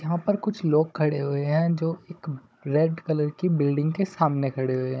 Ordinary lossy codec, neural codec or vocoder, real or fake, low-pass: none; codec, 16 kHz, 16 kbps, FunCodec, trained on Chinese and English, 50 frames a second; fake; none